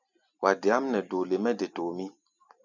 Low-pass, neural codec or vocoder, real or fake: 7.2 kHz; vocoder, 44.1 kHz, 128 mel bands every 256 samples, BigVGAN v2; fake